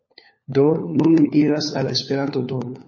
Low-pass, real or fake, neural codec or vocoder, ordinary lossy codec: 7.2 kHz; fake; codec, 16 kHz, 4 kbps, FunCodec, trained on LibriTTS, 50 frames a second; MP3, 32 kbps